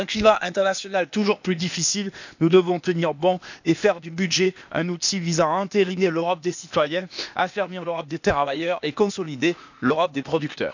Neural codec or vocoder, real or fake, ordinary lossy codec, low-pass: codec, 16 kHz, 0.8 kbps, ZipCodec; fake; none; 7.2 kHz